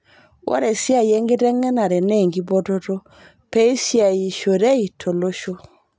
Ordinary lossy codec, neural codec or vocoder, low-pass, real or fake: none; none; none; real